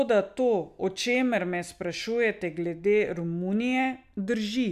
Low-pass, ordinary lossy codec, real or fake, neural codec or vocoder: 14.4 kHz; none; real; none